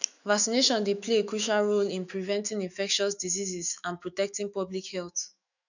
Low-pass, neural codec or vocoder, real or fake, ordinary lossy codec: 7.2 kHz; autoencoder, 48 kHz, 128 numbers a frame, DAC-VAE, trained on Japanese speech; fake; none